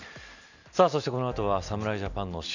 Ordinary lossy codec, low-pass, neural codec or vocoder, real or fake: none; 7.2 kHz; none; real